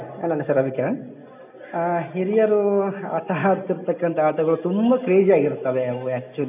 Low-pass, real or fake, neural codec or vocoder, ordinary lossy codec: 3.6 kHz; real; none; none